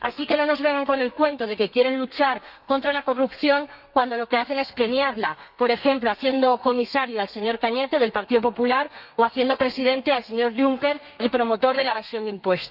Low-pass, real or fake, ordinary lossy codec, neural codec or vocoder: 5.4 kHz; fake; none; codec, 32 kHz, 1.9 kbps, SNAC